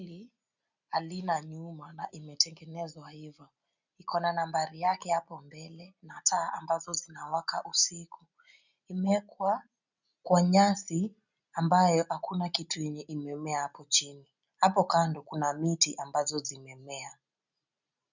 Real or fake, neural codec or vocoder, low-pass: real; none; 7.2 kHz